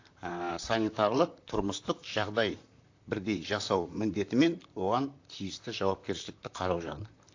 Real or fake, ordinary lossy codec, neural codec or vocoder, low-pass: fake; AAC, 48 kbps; vocoder, 44.1 kHz, 128 mel bands, Pupu-Vocoder; 7.2 kHz